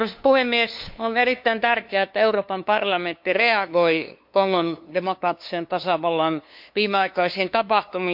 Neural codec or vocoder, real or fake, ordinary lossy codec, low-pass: codec, 16 kHz, 2 kbps, FunCodec, trained on LibriTTS, 25 frames a second; fake; MP3, 48 kbps; 5.4 kHz